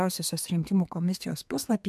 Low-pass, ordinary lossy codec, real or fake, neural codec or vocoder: 14.4 kHz; MP3, 96 kbps; fake; codec, 32 kHz, 1.9 kbps, SNAC